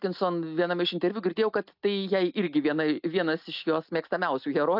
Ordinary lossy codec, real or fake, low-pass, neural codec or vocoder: AAC, 48 kbps; real; 5.4 kHz; none